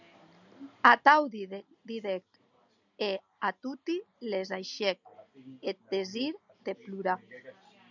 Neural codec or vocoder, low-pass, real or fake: none; 7.2 kHz; real